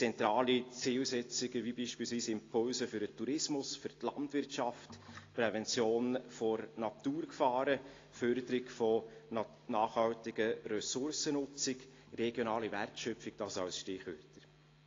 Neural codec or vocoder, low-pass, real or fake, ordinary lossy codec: none; 7.2 kHz; real; AAC, 32 kbps